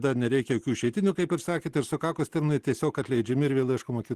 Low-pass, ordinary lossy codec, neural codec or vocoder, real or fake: 14.4 kHz; Opus, 16 kbps; none; real